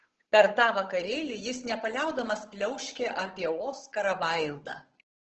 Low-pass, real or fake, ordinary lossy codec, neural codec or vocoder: 7.2 kHz; fake; Opus, 16 kbps; codec, 16 kHz, 8 kbps, FunCodec, trained on Chinese and English, 25 frames a second